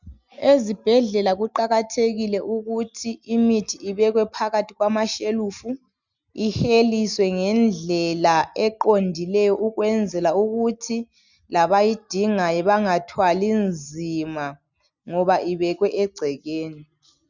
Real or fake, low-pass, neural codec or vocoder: real; 7.2 kHz; none